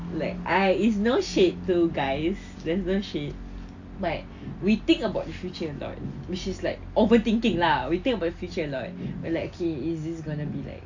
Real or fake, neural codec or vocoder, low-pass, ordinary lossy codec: real; none; 7.2 kHz; AAC, 48 kbps